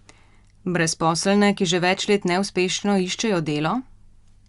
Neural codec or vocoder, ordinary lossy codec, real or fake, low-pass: none; Opus, 64 kbps; real; 10.8 kHz